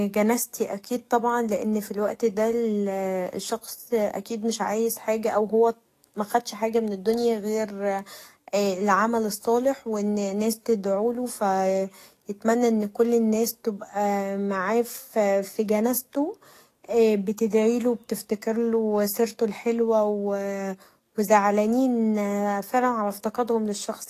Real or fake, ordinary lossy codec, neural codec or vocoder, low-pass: fake; AAC, 48 kbps; codec, 44.1 kHz, 7.8 kbps, DAC; 14.4 kHz